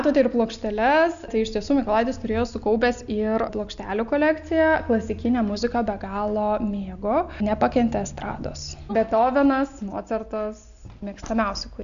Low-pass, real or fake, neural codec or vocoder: 7.2 kHz; real; none